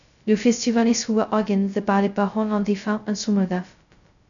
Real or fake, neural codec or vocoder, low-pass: fake; codec, 16 kHz, 0.2 kbps, FocalCodec; 7.2 kHz